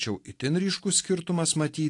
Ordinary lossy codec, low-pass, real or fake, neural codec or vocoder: AAC, 48 kbps; 10.8 kHz; real; none